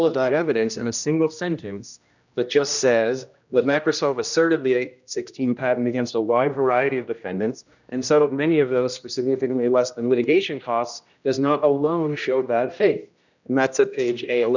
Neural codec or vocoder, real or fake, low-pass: codec, 16 kHz, 1 kbps, X-Codec, HuBERT features, trained on general audio; fake; 7.2 kHz